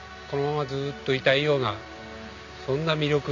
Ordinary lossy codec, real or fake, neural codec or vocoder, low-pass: none; real; none; 7.2 kHz